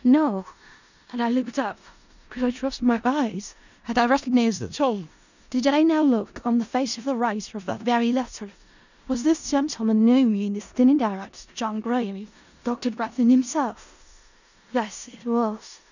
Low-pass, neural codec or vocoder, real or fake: 7.2 kHz; codec, 16 kHz in and 24 kHz out, 0.4 kbps, LongCat-Audio-Codec, four codebook decoder; fake